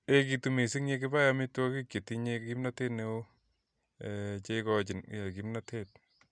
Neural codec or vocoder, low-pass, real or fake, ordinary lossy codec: none; 9.9 kHz; real; none